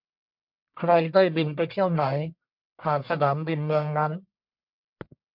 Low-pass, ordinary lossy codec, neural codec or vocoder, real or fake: 5.4 kHz; MP3, 48 kbps; codec, 44.1 kHz, 1.7 kbps, Pupu-Codec; fake